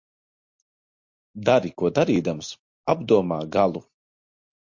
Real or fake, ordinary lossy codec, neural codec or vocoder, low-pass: real; MP3, 48 kbps; none; 7.2 kHz